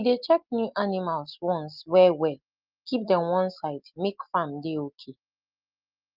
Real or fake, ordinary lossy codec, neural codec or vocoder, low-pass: real; Opus, 32 kbps; none; 5.4 kHz